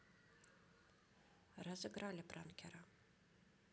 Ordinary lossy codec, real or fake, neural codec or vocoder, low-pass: none; real; none; none